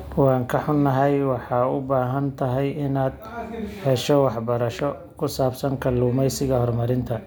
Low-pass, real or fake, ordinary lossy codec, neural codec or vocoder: none; real; none; none